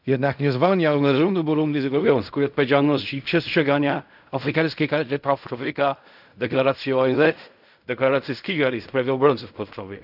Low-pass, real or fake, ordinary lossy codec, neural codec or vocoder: 5.4 kHz; fake; none; codec, 16 kHz in and 24 kHz out, 0.4 kbps, LongCat-Audio-Codec, fine tuned four codebook decoder